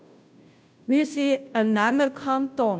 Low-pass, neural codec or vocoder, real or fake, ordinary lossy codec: none; codec, 16 kHz, 0.5 kbps, FunCodec, trained on Chinese and English, 25 frames a second; fake; none